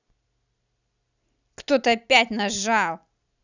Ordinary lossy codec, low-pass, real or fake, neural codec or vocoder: none; 7.2 kHz; real; none